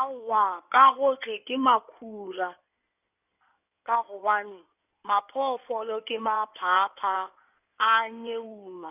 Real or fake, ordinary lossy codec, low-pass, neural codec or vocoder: fake; none; 3.6 kHz; codec, 16 kHz in and 24 kHz out, 2.2 kbps, FireRedTTS-2 codec